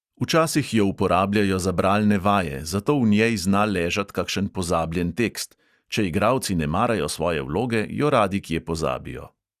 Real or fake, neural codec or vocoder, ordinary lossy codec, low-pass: real; none; Opus, 64 kbps; 14.4 kHz